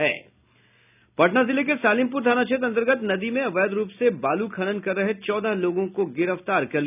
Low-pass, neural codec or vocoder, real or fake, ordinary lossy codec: 3.6 kHz; none; real; none